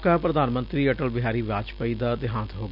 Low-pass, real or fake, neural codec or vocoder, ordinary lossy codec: 5.4 kHz; real; none; none